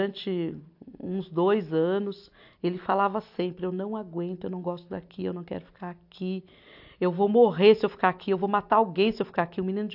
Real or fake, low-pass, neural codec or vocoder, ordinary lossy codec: real; 5.4 kHz; none; AAC, 48 kbps